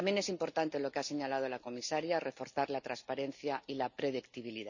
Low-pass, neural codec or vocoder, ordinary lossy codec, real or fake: 7.2 kHz; none; none; real